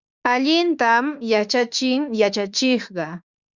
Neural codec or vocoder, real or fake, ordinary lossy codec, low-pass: autoencoder, 48 kHz, 32 numbers a frame, DAC-VAE, trained on Japanese speech; fake; Opus, 64 kbps; 7.2 kHz